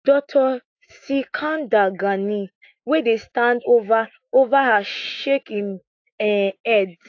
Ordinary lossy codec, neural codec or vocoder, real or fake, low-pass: AAC, 48 kbps; autoencoder, 48 kHz, 128 numbers a frame, DAC-VAE, trained on Japanese speech; fake; 7.2 kHz